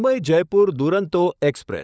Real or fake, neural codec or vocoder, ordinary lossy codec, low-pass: fake; codec, 16 kHz, 16 kbps, FunCodec, trained on LibriTTS, 50 frames a second; none; none